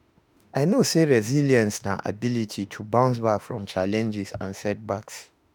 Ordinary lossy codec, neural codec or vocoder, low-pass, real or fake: none; autoencoder, 48 kHz, 32 numbers a frame, DAC-VAE, trained on Japanese speech; none; fake